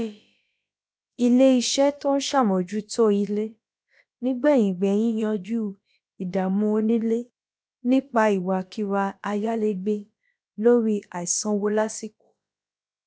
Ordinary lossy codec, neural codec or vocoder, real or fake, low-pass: none; codec, 16 kHz, about 1 kbps, DyCAST, with the encoder's durations; fake; none